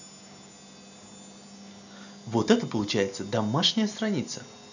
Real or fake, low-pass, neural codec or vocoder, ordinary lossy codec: real; 7.2 kHz; none; none